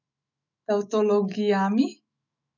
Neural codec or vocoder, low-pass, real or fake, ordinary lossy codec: autoencoder, 48 kHz, 128 numbers a frame, DAC-VAE, trained on Japanese speech; 7.2 kHz; fake; none